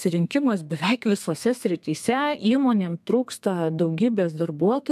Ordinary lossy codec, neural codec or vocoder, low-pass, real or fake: AAC, 96 kbps; codec, 32 kHz, 1.9 kbps, SNAC; 14.4 kHz; fake